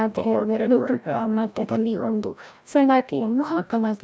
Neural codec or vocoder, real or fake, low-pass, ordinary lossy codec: codec, 16 kHz, 0.5 kbps, FreqCodec, larger model; fake; none; none